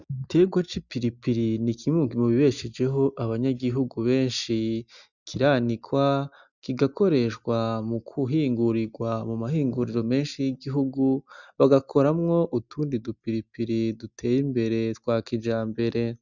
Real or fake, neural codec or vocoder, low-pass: real; none; 7.2 kHz